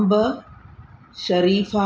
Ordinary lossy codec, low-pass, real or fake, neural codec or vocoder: none; none; real; none